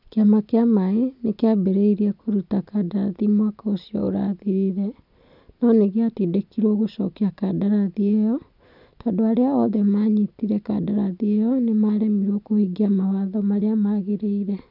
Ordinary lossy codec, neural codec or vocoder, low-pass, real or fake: none; none; 5.4 kHz; real